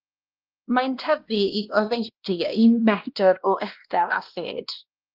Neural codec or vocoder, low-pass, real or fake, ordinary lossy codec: codec, 16 kHz, 1 kbps, X-Codec, WavLM features, trained on Multilingual LibriSpeech; 5.4 kHz; fake; Opus, 24 kbps